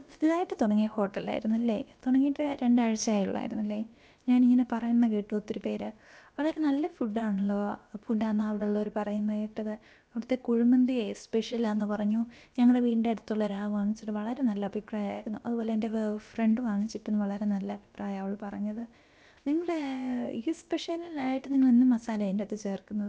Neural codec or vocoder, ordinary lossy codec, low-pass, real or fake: codec, 16 kHz, about 1 kbps, DyCAST, with the encoder's durations; none; none; fake